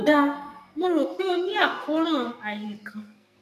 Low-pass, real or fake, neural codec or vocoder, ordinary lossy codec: 14.4 kHz; fake; codec, 44.1 kHz, 2.6 kbps, SNAC; none